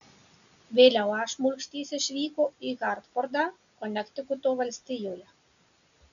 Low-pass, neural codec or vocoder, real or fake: 7.2 kHz; none; real